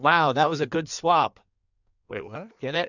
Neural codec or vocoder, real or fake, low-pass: codec, 16 kHz in and 24 kHz out, 1.1 kbps, FireRedTTS-2 codec; fake; 7.2 kHz